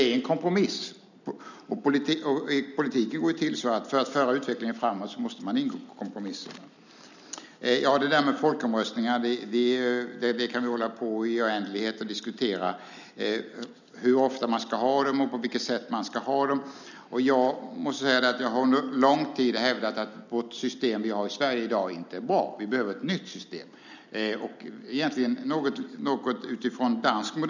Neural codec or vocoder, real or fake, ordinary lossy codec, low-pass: none; real; none; 7.2 kHz